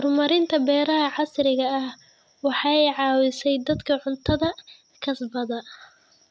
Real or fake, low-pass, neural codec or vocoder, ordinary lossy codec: real; none; none; none